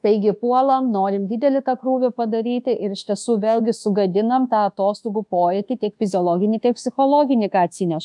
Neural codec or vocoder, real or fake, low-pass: codec, 24 kHz, 1.2 kbps, DualCodec; fake; 10.8 kHz